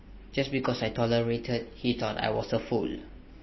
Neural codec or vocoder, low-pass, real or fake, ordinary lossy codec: none; 7.2 kHz; real; MP3, 24 kbps